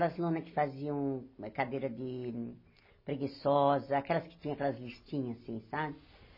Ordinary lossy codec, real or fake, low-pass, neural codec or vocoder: MP3, 24 kbps; real; 5.4 kHz; none